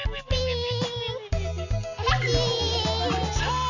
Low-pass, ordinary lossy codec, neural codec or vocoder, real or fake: 7.2 kHz; none; none; real